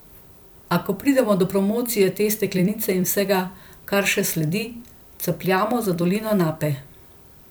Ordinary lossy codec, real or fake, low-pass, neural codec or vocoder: none; fake; none; vocoder, 44.1 kHz, 128 mel bands every 256 samples, BigVGAN v2